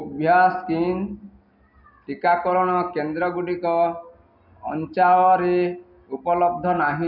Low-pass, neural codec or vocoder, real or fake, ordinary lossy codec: 5.4 kHz; none; real; none